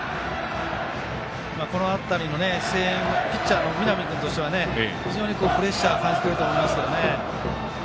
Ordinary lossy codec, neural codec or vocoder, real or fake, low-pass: none; none; real; none